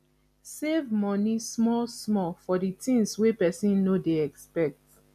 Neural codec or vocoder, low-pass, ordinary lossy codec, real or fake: none; 14.4 kHz; none; real